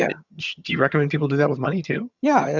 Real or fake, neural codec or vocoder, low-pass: fake; vocoder, 22.05 kHz, 80 mel bands, HiFi-GAN; 7.2 kHz